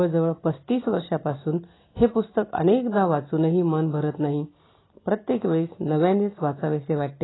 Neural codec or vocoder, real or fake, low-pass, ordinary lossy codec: none; real; 7.2 kHz; AAC, 16 kbps